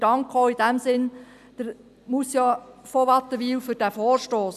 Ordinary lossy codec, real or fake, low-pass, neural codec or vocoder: none; real; 14.4 kHz; none